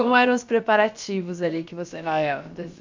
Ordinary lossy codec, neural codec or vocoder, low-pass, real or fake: MP3, 64 kbps; codec, 16 kHz, about 1 kbps, DyCAST, with the encoder's durations; 7.2 kHz; fake